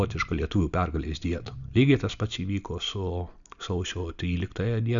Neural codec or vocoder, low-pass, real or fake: none; 7.2 kHz; real